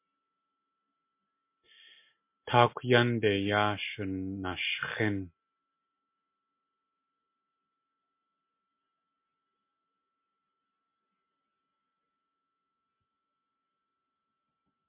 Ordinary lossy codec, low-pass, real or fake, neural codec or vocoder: MP3, 24 kbps; 3.6 kHz; real; none